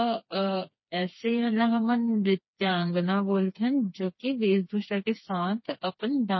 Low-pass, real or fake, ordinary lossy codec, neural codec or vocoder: 7.2 kHz; fake; MP3, 24 kbps; codec, 16 kHz, 4 kbps, FreqCodec, smaller model